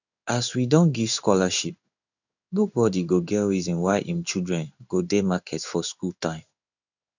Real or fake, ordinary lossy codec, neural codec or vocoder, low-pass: fake; none; codec, 16 kHz in and 24 kHz out, 1 kbps, XY-Tokenizer; 7.2 kHz